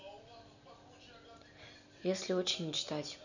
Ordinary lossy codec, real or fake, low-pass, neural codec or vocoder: none; real; 7.2 kHz; none